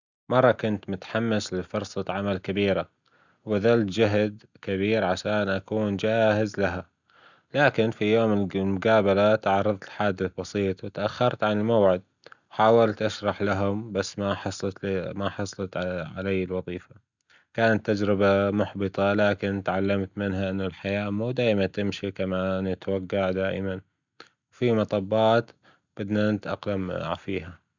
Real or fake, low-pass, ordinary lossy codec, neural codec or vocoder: real; 7.2 kHz; none; none